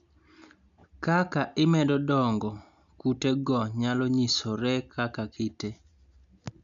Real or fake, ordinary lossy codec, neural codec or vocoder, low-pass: real; none; none; 7.2 kHz